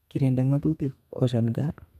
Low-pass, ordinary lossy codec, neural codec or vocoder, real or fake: 14.4 kHz; none; codec, 32 kHz, 1.9 kbps, SNAC; fake